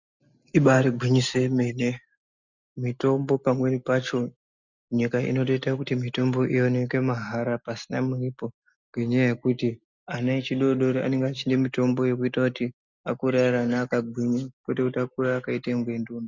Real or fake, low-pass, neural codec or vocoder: real; 7.2 kHz; none